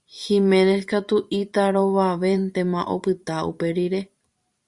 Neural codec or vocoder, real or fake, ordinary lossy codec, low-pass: vocoder, 44.1 kHz, 128 mel bands every 512 samples, BigVGAN v2; fake; Opus, 64 kbps; 10.8 kHz